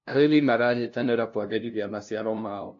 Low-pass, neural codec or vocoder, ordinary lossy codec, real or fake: 7.2 kHz; codec, 16 kHz, 0.5 kbps, FunCodec, trained on LibriTTS, 25 frames a second; AAC, 48 kbps; fake